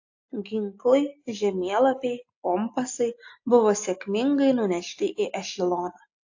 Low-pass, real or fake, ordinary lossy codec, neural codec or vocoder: 7.2 kHz; real; AAC, 48 kbps; none